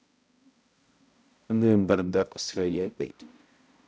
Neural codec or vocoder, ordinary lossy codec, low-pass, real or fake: codec, 16 kHz, 0.5 kbps, X-Codec, HuBERT features, trained on balanced general audio; none; none; fake